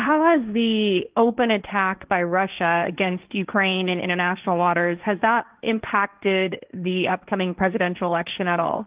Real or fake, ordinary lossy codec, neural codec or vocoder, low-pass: fake; Opus, 32 kbps; codec, 16 kHz, 1.1 kbps, Voila-Tokenizer; 3.6 kHz